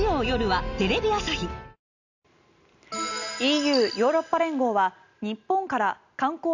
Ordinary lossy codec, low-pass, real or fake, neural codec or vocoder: none; 7.2 kHz; real; none